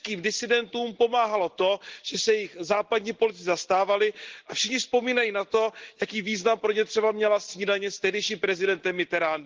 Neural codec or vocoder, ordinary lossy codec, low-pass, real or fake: none; Opus, 16 kbps; 7.2 kHz; real